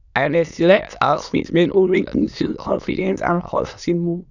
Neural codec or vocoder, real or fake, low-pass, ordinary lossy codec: autoencoder, 22.05 kHz, a latent of 192 numbers a frame, VITS, trained on many speakers; fake; 7.2 kHz; none